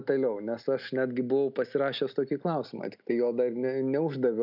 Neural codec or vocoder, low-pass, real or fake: none; 5.4 kHz; real